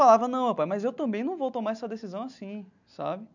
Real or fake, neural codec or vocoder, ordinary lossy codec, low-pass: real; none; none; 7.2 kHz